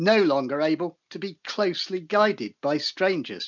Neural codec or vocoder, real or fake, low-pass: none; real; 7.2 kHz